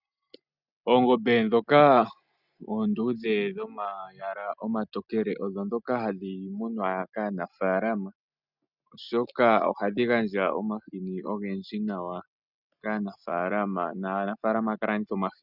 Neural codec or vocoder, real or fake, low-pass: none; real; 5.4 kHz